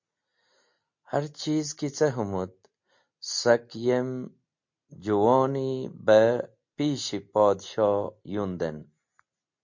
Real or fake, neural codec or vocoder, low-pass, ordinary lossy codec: real; none; 7.2 kHz; MP3, 48 kbps